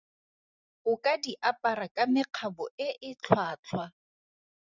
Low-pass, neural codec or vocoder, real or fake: 7.2 kHz; vocoder, 44.1 kHz, 128 mel bands every 512 samples, BigVGAN v2; fake